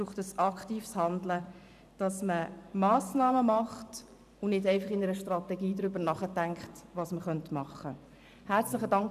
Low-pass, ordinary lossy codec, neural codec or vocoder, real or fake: 14.4 kHz; none; vocoder, 44.1 kHz, 128 mel bands every 512 samples, BigVGAN v2; fake